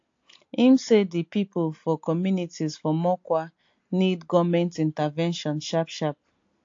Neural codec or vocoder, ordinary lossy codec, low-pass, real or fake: none; AAC, 48 kbps; 7.2 kHz; real